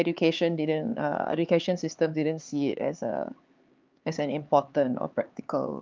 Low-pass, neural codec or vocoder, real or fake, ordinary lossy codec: 7.2 kHz; codec, 16 kHz, 4 kbps, X-Codec, HuBERT features, trained on LibriSpeech; fake; Opus, 24 kbps